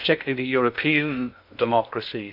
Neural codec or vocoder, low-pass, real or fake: codec, 16 kHz in and 24 kHz out, 0.6 kbps, FocalCodec, streaming, 2048 codes; 5.4 kHz; fake